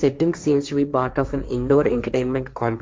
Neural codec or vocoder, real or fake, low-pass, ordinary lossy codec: codec, 16 kHz, 1.1 kbps, Voila-Tokenizer; fake; none; none